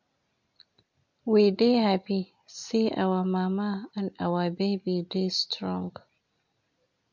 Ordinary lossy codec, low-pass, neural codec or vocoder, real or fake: MP3, 48 kbps; 7.2 kHz; none; real